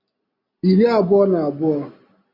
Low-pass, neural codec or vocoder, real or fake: 5.4 kHz; none; real